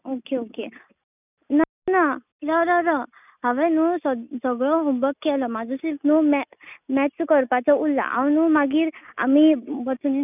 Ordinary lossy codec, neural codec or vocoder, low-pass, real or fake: none; none; 3.6 kHz; real